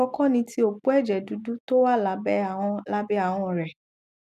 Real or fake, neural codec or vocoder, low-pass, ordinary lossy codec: real; none; 14.4 kHz; none